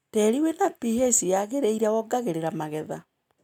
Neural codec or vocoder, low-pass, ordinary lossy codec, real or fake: none; 19.8 kHz; none; real